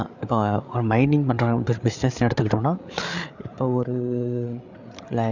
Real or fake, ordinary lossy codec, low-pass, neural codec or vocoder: fake; none; 7.2 kHz; codec, 16 kHz, 8 kbps, FreqCodec, larger model